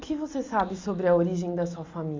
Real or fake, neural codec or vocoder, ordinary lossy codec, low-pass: real; none; MP3, 64 kbps; 7.2 kHz